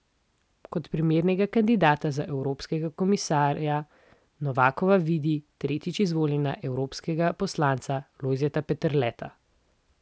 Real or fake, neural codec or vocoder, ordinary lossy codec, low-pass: real; none; none; none